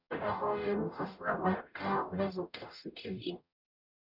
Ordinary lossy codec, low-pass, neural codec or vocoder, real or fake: none; 5.4 kHz; codec, 44.1 kHz, 0.9 kbps, DAC; fake